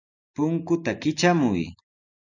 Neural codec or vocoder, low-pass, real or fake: none; 7.2 kHz; real